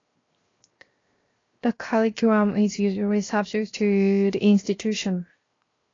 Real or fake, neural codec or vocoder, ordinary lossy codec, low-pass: fake; codec, 16 kHz, 0.7 kbps, FocalCodec; AAC, 32 kbps; 7.2 kHz